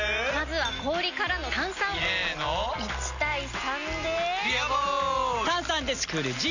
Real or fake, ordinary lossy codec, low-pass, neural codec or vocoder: real; none; 7.2 kHz; none